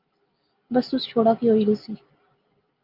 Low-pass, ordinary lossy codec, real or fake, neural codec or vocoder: 5.4 kHz; Opus, 32 kbps; real; none